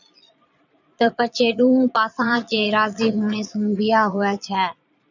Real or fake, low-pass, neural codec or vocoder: fake; 7.2 kHz; vocoder, 22.05 kHz, 80 mel bands, Vocos